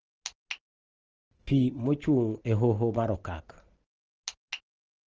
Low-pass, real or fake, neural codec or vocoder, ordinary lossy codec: 7.2 kHz; real; none; Opus, 16 kbps